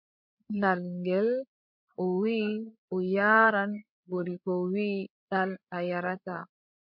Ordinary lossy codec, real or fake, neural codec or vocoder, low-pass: MP3, 48 kbps; fake; codec, 16 kHz, 16 kbps, FreqCodec, larger model; 5.4 kHz